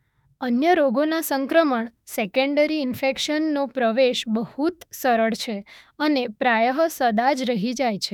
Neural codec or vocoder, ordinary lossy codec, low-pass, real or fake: autoencoder, 48 kHz, 32 numbers a frame, DAC-VAE, trained on Japanese speech; none; 19.8 kHz; fake